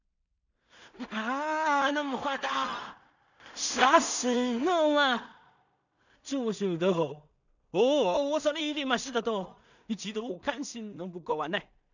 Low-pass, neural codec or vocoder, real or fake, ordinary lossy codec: 7.2 kHz; codec, 16 kHz in and 24 kHz out, 0.4 kbps, LongCat-Audio-Codec, two codebook decoder; fake; none